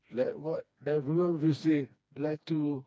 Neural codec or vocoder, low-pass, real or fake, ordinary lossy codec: codec, 16 kHz, 2 kbps, FreqCodec, smaller model; none; fake; none